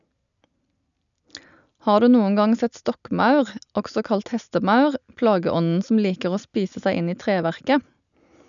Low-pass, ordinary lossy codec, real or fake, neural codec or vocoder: 7.2 kHz; none; real; none